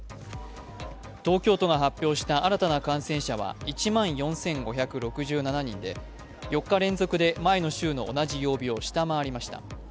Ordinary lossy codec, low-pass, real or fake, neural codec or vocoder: none; none; real; none